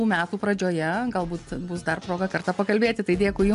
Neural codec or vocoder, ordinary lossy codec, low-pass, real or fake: none; AAC, 64 kbps; 10.8 kHz; real